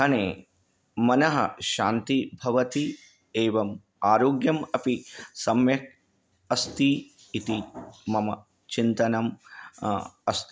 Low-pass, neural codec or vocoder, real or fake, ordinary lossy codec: none; none; real; none